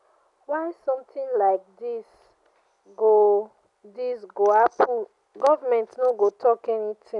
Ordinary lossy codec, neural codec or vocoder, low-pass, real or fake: none; none; 10.8 kHz; real